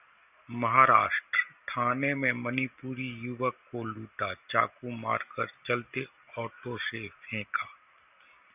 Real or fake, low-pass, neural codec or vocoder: real; 3.6 kHz; none